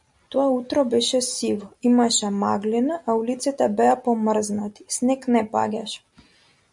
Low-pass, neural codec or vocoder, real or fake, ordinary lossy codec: 10.8 kHz; none; real; MP3, 96 kbps